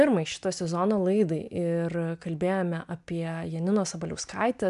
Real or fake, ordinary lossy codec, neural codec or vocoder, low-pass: real; AAC, 96 kbps; none; 10.8 kHz